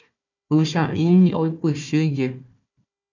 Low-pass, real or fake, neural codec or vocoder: 7.2 kHz; fake; codec, 16 kHz, 1 kbps, FunCodec, trained on Chinese and English, 50 frames a second